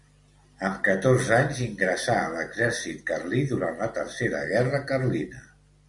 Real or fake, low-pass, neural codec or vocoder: real; 10.8 kHz; none